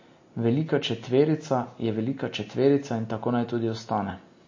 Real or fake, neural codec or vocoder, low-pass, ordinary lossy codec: real; none; 7.2 kHz; MP3, 32 kbps